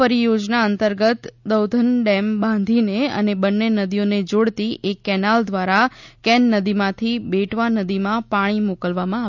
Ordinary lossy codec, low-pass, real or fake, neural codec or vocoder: none; 7.2 kHz; real; none